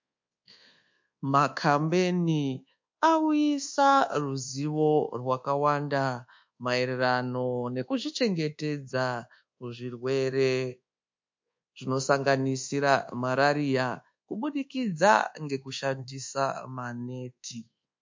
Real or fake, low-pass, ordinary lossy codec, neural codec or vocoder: fake; 7.2 kHz; MP3, 48 kbps; codec, 24 kHz, 1.2 kbps, DualCodec